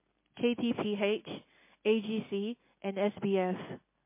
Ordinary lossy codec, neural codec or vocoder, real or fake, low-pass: MP3, 24 kbps; none; real; 3.6 kHz